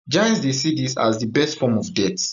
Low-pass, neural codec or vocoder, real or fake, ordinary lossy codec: 7.2 kHz; none; real; none